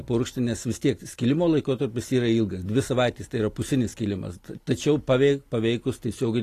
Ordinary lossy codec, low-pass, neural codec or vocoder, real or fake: AAC, 48 kbps; 14.4 kHz; none; real